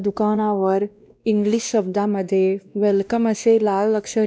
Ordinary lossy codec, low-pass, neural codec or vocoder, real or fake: none; none; codec, 16 kHz, 1 kbps, X-Codec, WavLM features, trained on Multilingual LibriSpeech; fake